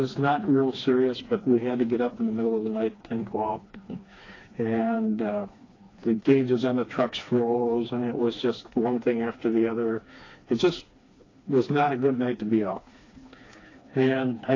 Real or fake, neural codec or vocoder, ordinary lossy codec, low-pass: fake; codec, 16 kHz, 2 kbps, FreqCodec, smaller model; AAC, 32 kbps; 7.2 kHz